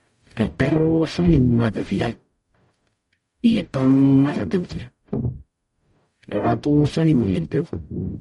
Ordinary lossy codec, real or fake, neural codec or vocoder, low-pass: MP3, 48 kbps; fake; codec, 44.1 kHz, 0.9 kbps, DAC; 19.8 kHz